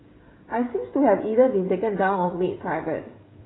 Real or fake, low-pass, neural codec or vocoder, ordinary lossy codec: fake; 7.2 kHz; codec, 16 kHz, 16 kbps, FreqCodec, smaller model; AAC, 16 kbps